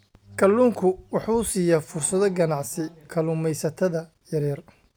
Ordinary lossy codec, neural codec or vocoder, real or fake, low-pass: none; none; real; none